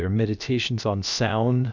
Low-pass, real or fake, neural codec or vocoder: 7.2 kHz; fake; codec, 16 kHz, about 1 kbps, DyCAST, with the encoder's durations